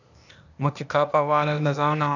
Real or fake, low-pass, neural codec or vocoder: fake; 7.2 kHz; codec, 16 kHz, 0.8 kbps, ZipCodec